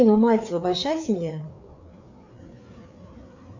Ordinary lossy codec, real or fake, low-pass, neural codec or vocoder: MP3, 64 kbps; fake; 7.2 kHz; codec, 16 kHz, 4 kbps, FreqCodec, larger model